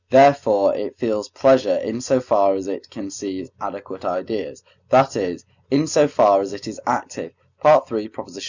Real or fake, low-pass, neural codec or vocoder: real; 7.2 kHz; none